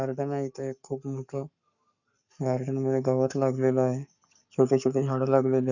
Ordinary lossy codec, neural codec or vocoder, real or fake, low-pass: none; codec, 44.1 kHz, 7.8 kbps, Pupu-Codec; fake; 7.2 kHz